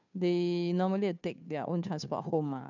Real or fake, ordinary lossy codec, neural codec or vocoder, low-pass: fake; none; codec, 16 kHz, 2 kbps, FunCodec, trained on Chinese and English, 25 frames a second; 7.2 kHz